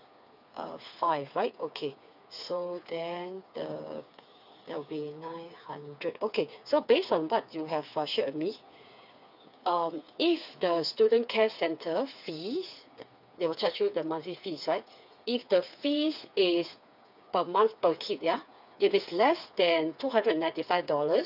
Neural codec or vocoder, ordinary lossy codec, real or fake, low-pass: codec, 16 kHz, 4 kbps, FreqCodec, smaller model; none; fake; 5.4 kHz